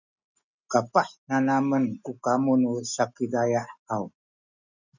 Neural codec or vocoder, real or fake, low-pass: none; real; 7.2 kHz